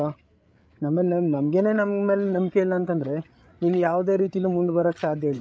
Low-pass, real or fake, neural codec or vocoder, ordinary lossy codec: none; fake; codec, 16 kHz, 8 kbps, FreqCodec, larger model; none